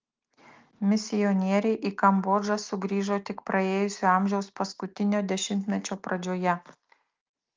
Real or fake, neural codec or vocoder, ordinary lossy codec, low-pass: real; none; Opus, 32 kbps; 7.2 kHz